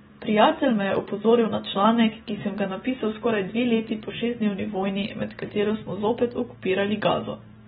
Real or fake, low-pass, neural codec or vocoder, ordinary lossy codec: real; 10.8 kHz; none; AAC, 16 kbps